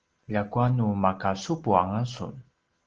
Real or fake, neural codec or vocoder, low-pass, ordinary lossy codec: real; none; 7.2 kHz; Opus, 16 kbps